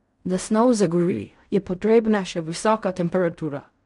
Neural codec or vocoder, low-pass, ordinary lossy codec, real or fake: codec, 16 kHz in and 24 kHz out, 0.4 kbps, LongCat-Audio-Codec, fine tuned four codebook decoder; 10.8 kHz; none; fake